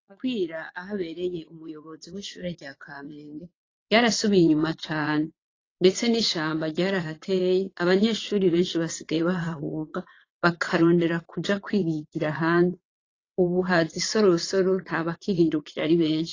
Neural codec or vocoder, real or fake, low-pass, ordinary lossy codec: vocoder, 22.05 kHz, 80 mel bands, WaveNeXt; fake; 7.2 kHz; AAC, 32 kbps